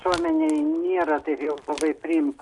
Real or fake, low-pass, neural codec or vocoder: real; 10.8 kHz; none